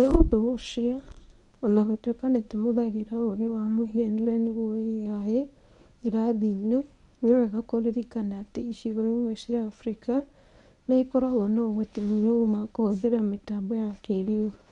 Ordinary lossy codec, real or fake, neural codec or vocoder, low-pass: none; fake; codec, 24 kHz, 0.9 kbps, WavTokenizer, medium speech release version 1; 10.8 kHz